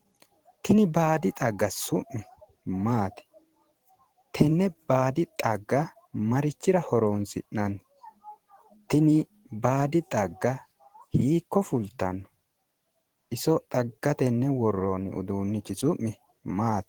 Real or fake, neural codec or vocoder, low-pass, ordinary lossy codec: fake; vocoder, 44.1 kHz, 128 mel bands every 512 samples, BigVGAN v2; 19.8 kHz; Opus, 16 kbps